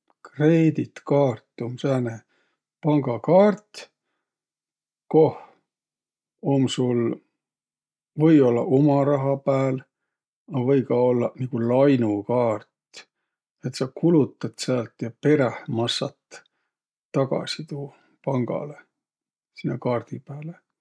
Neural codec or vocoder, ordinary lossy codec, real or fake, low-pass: none; none; real; none